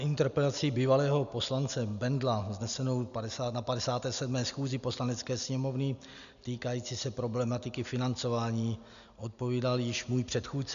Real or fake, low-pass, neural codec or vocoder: real; 7.2 kHz; none